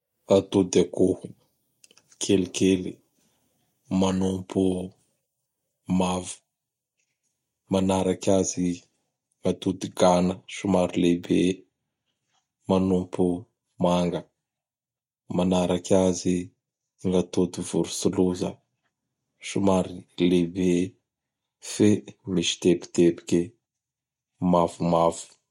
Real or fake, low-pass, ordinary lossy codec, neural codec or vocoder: real; 19.8 kHz; MP3, 64 kbps; none